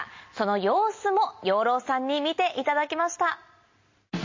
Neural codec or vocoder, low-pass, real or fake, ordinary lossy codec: none; 7.2 kHz; real; MP3, 32 kbps